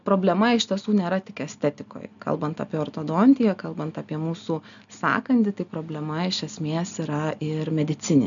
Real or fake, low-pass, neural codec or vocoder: real; 7.2 kHz; none